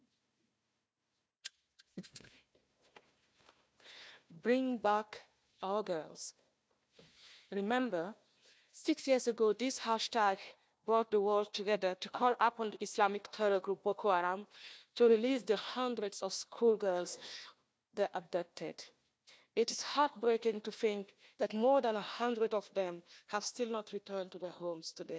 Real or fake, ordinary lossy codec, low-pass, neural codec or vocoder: fake; none; none; codec, 16 kHz, 1 kbps, FunCodec, trained on Chinese and English, 50 frames a second